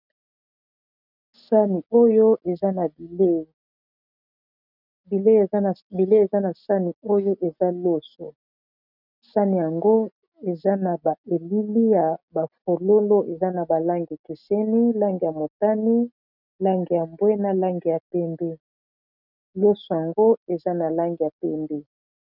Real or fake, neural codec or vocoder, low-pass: real; none; 5.4 kHz